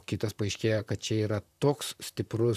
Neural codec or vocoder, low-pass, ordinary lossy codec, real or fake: vocoder, 44.1 kHz, 128 mel bands, Pupu-Vocoder; 14.4 kHz; AAC, 96 kbps; fake